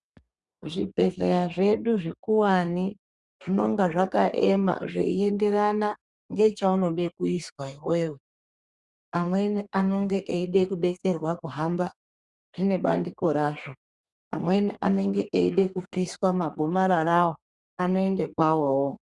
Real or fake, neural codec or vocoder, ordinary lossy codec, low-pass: fake; codec, 32 kHz, 1.9 kbps, SNAC; Opus, 64 kbps; 10.8 kHz